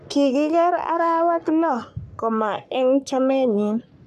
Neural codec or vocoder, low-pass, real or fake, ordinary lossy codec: codec, 44.1 kHz, 3.4 kbps, Pupu-Codec; 14.4 kHz; fake; none